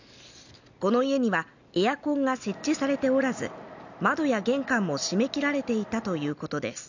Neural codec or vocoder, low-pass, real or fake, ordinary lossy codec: none; 7.2 kHz; real; none